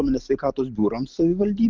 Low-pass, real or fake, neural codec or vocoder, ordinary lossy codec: 7.2 kHz; real; none; Opus, 24 kbps